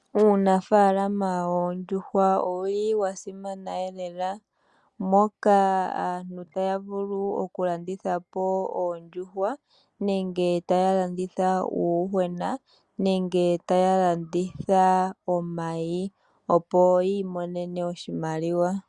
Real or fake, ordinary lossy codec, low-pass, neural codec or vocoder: real; Opus, 64 kbps; 10.8 kHz; none